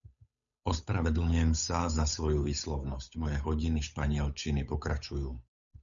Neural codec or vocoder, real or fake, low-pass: codec, 16 kHz, 8 kbps, FunCodec, trained on Chinese and English, 25 frames a second; fake; 7.2 kHz